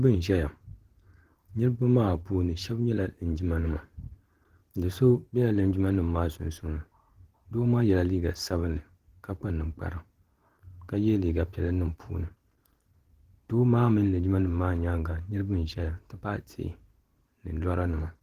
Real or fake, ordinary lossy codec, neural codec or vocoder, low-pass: fake; Opus, 16 kbps; vocoder, 44.1 kHz, 128 mel bands every 512 samples, BigVGAN v2; 14.4 kHz